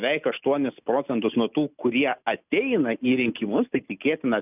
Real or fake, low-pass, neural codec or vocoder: real; 3.6 kHz; none